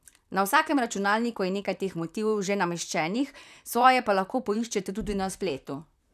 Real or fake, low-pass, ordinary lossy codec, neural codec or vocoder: fake; 14.4 kHz; none; vocoder, 44.1 kHz, 128 mel bands, Pupu-Vocoder